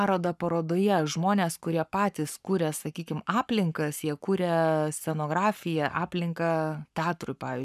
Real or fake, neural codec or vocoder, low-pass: fake; codec, 44.1 kHz, 7.8 kbps, Pupu-Codec; 14.4 kHz